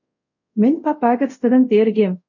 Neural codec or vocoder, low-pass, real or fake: codec, 24 kHz, 0.5 kbps, DualCodec; 7.2 kHz; fake